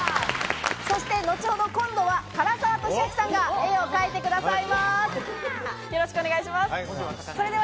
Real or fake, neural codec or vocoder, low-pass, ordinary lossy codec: real; none; none; none